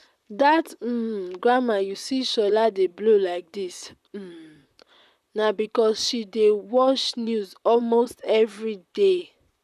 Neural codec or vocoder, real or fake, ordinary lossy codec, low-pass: vocoder, 44.1 kHz, 128 mel bands, Pupu-Vocoder; fake; none; 14.4 kHz